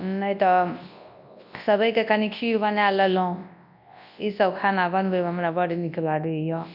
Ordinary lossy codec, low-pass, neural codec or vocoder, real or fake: none; 5.4 kHz; codec, 24 kHz, 0.9 kbps, WavTokenizer, large speech release; fake